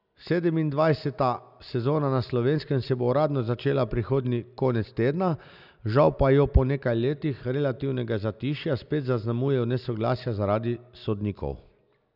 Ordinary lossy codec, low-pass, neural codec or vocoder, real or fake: none; 5.4 kHz; none; real